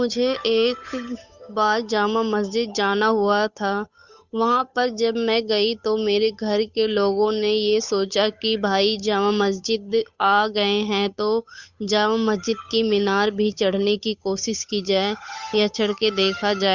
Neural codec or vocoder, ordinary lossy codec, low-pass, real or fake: codec, 16 kHz, 8 kbps, FunCodec, trained on Chinese and English, 25 frames a second; Opus, 64 kbps; 7.2 kHz; fake